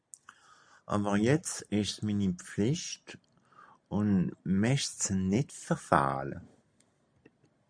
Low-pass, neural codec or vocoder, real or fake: 9.9 kHz; none; real